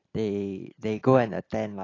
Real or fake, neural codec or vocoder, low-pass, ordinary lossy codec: real; none; 7.2 kHz; AAC, 32 kbps